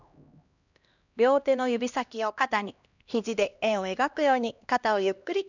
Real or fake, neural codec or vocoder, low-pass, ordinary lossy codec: fake; codec, 16 kHz, 1 kbps, X-Codec, HuBERT features, trained on LibriSpeech; 7.2 kHz; none